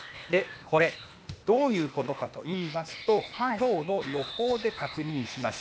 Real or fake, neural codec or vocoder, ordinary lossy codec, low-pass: fake; codec, 16 kHz, 0.8 kbps, ZipCodec; none; none